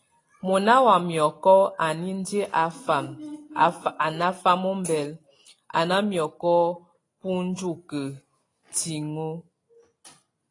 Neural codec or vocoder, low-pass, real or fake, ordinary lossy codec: none; 10.8 kHz; real; AAC, 32 kbps